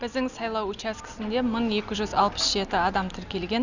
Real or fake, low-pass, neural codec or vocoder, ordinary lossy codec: real; 7.2 kHz; none; none